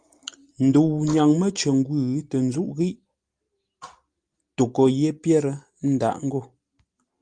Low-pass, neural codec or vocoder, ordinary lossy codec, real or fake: 9.9 kHz; none; Opus, 32 kbps; real